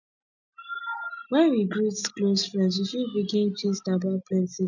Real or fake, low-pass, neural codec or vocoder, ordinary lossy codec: real; 7.2 kHz; none; none